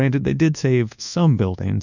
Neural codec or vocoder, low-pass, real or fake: codec, 24 kHz, 1.2 kbps, DualCodec; 7.2 kHz; fake